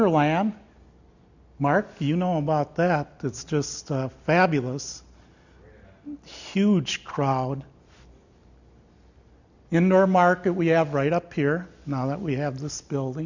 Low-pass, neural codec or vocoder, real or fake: 7.2 kHz; none; real